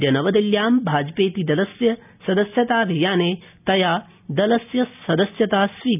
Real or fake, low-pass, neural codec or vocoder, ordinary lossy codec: real; 3.6 kHz; none; none